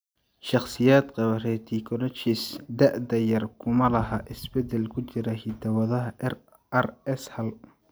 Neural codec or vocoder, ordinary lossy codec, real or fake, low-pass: none; none; real; none